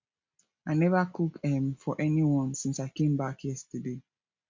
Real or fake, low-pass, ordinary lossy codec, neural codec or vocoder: real; 7.2 kHz; MP3, 64 kbps; none